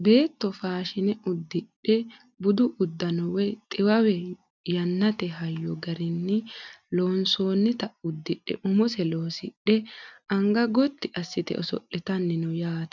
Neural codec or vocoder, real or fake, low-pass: none; real; 7.2 kHz